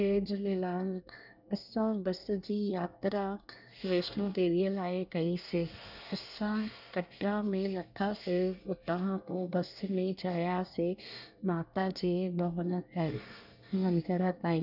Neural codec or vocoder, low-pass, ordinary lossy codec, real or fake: codec, 24 kHz, 1 kbps, SNAC; 5.4 kHz; none; fake